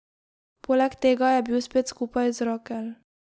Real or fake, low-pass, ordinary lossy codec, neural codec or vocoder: real; none; none; none